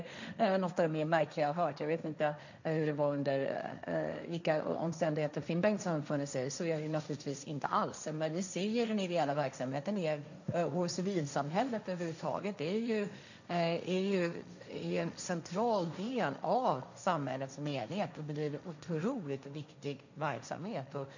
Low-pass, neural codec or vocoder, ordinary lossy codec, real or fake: 7.2 kHz; codec, 16 kHz, 1.1 kbps, Voila-Tokenizer; none; fake